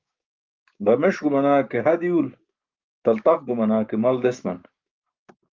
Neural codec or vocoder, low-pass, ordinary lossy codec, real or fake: codec, 16 kHz, 6 kbps, DAC; 7.2 kHz; Opus, 24 kbps; fake